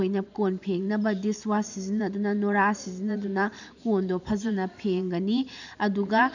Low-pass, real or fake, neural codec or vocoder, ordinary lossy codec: 7.2 kHz; fake; vocoder, 44.1 kHz, 128 mel bands every 512 samples, BigVGAN v2; none